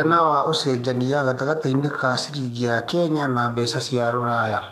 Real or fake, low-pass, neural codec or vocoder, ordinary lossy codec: fake; 14.4 kHz; codec, 32 kHz, 1.9 kbps, SNAC; none